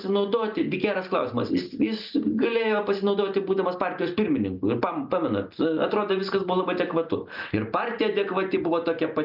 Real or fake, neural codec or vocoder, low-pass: real; none; 5.4 kHz